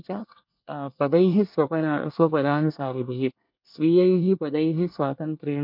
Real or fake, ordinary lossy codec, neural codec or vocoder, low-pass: fake; MP3, 48 kbps; codec, 24 kHz, 1 kbps, SNAC; 5.4 kHz